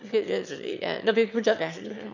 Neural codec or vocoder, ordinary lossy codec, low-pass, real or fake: autoencoder, 22.05 kHz, a latent of 192 numbers a frame, VITS, trained on one speaker; none; 7.2 kHz; fake